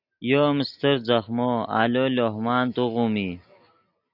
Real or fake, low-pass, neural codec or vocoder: real; 5.4 kHz; none